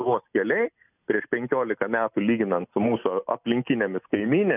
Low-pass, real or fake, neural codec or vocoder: 3.6 kHz; real; none